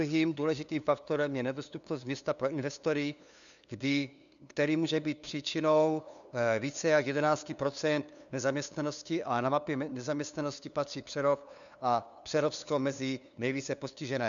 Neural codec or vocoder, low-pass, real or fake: codec, 16 kHz, 2 kbps, FunCodec, trained on LibriTTS, 25 frames a second; 7.2 kHz; fake